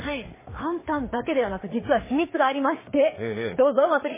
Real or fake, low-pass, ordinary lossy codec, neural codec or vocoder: fake; 3.6 kHz; MP3, 16 kbps; codec, 16 kHz, 4 kbps, FreqCodec, larger model